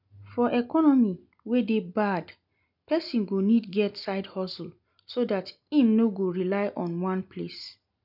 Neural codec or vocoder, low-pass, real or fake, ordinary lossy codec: none; 5.4 kHz; real; none